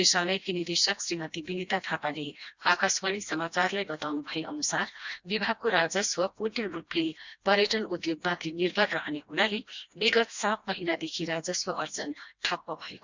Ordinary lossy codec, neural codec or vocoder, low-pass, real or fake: Opus, 64 kbps; codec, 16 kHz, 1 kbps, FreqCodec, smaller model; 7.2 kHz; fake